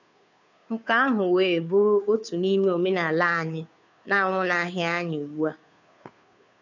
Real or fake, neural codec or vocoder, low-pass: fake; codec, 16 kHz, 2 kbps, FunCodec, trained on Chinese and English, 25 frames a second; 7.2 kHz